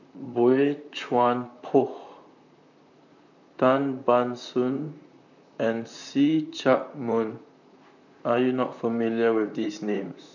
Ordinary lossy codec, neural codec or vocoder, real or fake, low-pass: none; vocoder, 44.1 kHz, 128 mel bands, Pupu-Vocoder; fake; 7.2 kHz